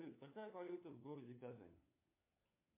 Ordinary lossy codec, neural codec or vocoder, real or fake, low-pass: MP3, 24 kbps; codec, 16 kHz, 4 kbps, FunCodec, trained on LibriTTS, 50 frames a second; fake; 3.6 kHz